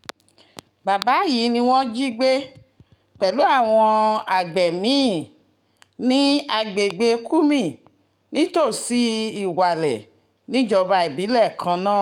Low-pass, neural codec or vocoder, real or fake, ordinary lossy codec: 19.8 kHz; codec, 44.1 kHz, 7.8 kbps, DAC; fake; none